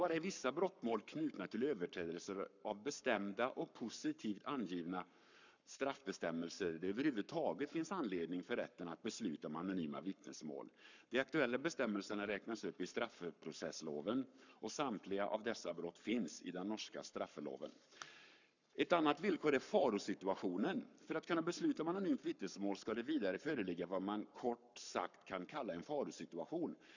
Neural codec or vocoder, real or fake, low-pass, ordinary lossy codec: codec, 44.1 kHz, 7.8 kbps, Pupu-Codec; fake; 7.2 kHz; none